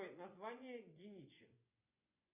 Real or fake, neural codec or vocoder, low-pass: fake; vocoder, 24 kHz, 100 mel bands, Vocos; 3.6 kHz